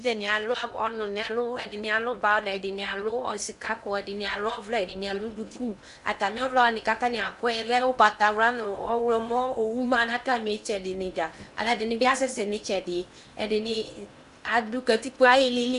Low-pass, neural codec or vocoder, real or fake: 10.8 kHz; codec, 16 kHz in and 24 kHz out, 0.8 kbps, FocalCodec, streaming, 65536 codes; fake